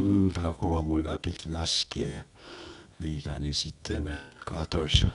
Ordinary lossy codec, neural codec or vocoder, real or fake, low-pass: none; codec, 24 kHz, 0.9 kbps, WavTokenizer, medium music audio release; fake; 10.8 kHz